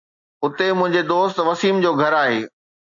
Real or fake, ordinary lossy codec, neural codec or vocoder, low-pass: real; MP3, 48 kbps; none; 7.2 kHz